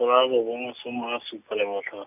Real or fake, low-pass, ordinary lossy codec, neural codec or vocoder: real; 3.6 kHz; none; none